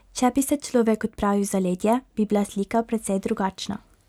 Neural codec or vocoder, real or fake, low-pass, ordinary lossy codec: none; real; 19.8 kHz; none